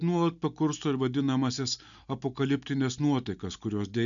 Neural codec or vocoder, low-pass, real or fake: none; 7.2 kHz; real